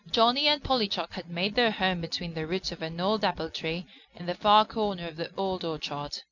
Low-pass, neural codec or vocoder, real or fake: 7.2 kHz; none; real